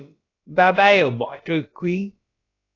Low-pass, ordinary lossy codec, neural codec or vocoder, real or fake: 7.2 kHz; AAC, 32 kbps; codec, 16 kHz, about 1 kbps, DyCAST, with the encoder's durations; fake